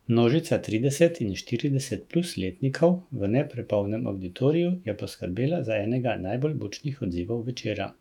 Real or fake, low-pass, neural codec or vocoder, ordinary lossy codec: fake; 19.8 kHz; autoencoder, 48 kHz, 128 numbers a frame, DAC-VAE, trained on Japanese speech; none